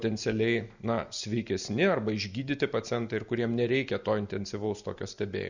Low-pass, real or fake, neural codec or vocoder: 7.2 kHz; real; none